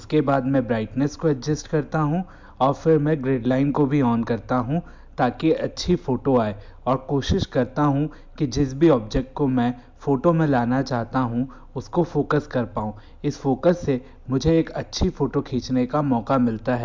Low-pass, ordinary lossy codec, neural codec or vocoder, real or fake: 7.2 kHz; MP3, 64 kbps; none; real